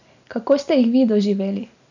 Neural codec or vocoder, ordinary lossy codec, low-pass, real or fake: none; none; 7.2 kHz; real